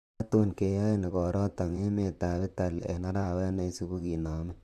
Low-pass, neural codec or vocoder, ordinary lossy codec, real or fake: 14.4 kHz; vocoder, 44.1 kHz, 128 mel bands, Pupu-Vocoder; AAC, 96 kbps; fake